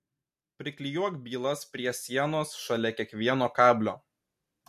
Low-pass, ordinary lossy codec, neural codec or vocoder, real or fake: 14.4 kHz; MP3, 64 kbps; none; real